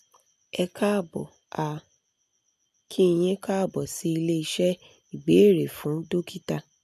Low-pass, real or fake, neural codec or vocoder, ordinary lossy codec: 14.4 kHz; real; none; none